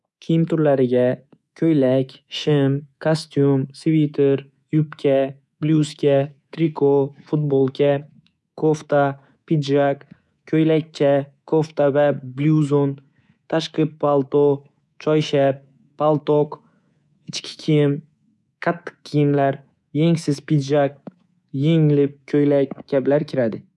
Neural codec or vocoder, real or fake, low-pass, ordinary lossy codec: codec, 24 kHz, 3.1 kbps, DualCodec; fake; none; none